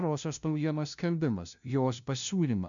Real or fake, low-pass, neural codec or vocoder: fake; 7.2 kHz; codec, 16 kHz, 0.5 kbps, FunCodec, trained on LibriTTS, 25 frames a second